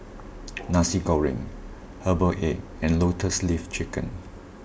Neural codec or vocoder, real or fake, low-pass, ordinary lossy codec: none; real; none; none